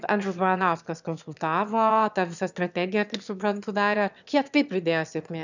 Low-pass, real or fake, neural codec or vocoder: 7.2 kHz; fake; autoencoder, 22.05 kHz, a latent of 192 numbers a frame, VITS, trained on one speaker